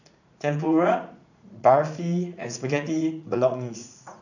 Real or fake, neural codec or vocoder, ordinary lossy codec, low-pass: fake; vocoder, 44.1 kHz, 80 mel bands, Vocos; none; 7.2 kHz